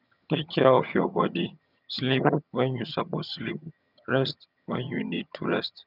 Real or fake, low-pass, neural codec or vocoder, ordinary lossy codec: fake; 5.4 kHz; vocoder, 22.05 kHz, 80 mel bands, HiFi-GAN; none